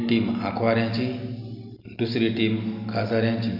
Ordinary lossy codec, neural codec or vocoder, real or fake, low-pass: none; none; real; 5.4 kHz